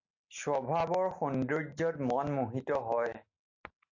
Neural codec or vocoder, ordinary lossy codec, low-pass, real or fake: none; AAC, 48 kbps; 7.2 kHz; real